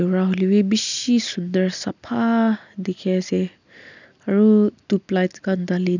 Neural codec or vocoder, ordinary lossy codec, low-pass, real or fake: none; none; 7.2 kHz; real